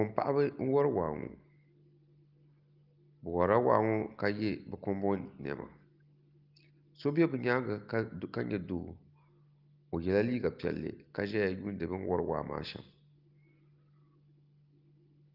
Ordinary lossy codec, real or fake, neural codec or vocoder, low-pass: Opus, 32 kbps; real; none; 5.4 kHz